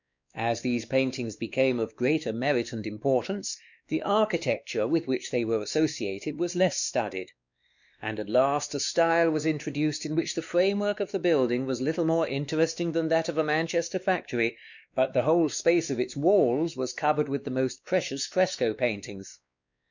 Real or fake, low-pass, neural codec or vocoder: fake; 7.2 kHz; codec, 16 kHz, 2 kbps, X-Codec, WavLM features, trained on Multilingual LibriSpeech